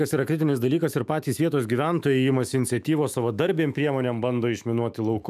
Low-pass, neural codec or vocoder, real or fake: 14.4 kHz; autoencoder, 48 kHz, 128 numbers a frame, DAC-VAE, trained on Japanese speech; fake